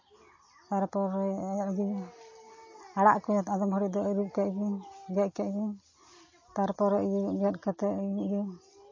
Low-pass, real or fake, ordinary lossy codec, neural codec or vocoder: 7.2 kHz; real; MP3, 32 kbps; none